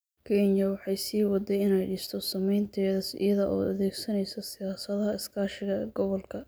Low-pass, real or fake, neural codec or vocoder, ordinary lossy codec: none; real; none; none